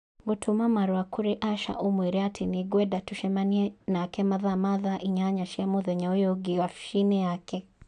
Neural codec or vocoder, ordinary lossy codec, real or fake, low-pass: none; none; real; 9.9 kHz